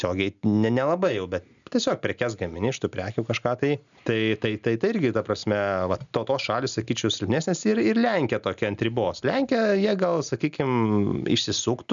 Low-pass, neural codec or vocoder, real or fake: 7.2 kHz; none; real